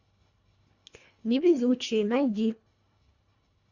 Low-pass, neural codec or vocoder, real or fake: 7.2 kHz; codec, 24 kHz, 1.5 kbps, HILCodec; fake